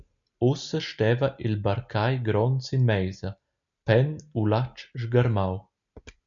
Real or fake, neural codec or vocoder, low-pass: real; none; 7.2 kHz